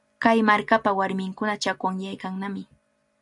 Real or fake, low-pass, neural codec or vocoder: real; 10.8 kHz; none